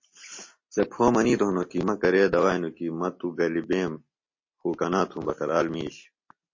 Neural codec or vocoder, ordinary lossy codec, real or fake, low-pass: none; MP3, 32 kbps; real; 7.2 kHz